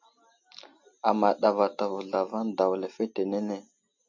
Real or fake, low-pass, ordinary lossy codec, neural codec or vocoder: real; 7.2 kHz; MP3, 48 kbps; none